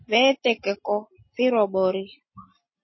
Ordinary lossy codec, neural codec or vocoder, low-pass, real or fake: MP3, 24 kbps; none; 7.2 kHz; real